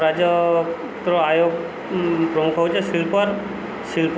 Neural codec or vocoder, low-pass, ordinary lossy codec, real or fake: none; none; none; real